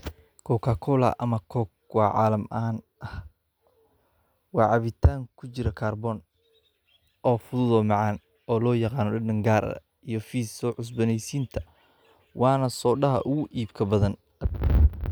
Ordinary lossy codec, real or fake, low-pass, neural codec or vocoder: none; real; none; none